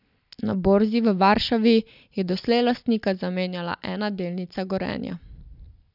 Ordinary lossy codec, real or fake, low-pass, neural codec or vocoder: none; real; 5.4 kHz; none